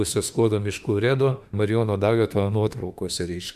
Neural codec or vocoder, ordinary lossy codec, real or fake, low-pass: autoencoder, 48 kHz, 32 numbers a frame, DAC-VAE, trained on Japanese speech; MP3, 96 kbps; fake; 14.4 kHz